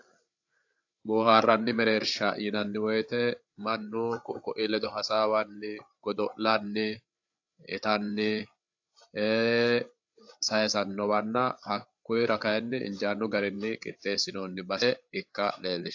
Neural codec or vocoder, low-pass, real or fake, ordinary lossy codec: codec, 16 kHz, 8 kbps, FreqCodec, larger model; 7.2 kHz; fake; AAC, 48 kbps